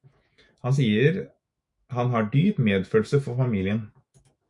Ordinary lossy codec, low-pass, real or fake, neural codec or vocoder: MP3, 64 kbps; 10.8 kHz; fake; autoencoder, 48 kHz, 128 numbers a frame, DAC-VAE, trained on Japanese speech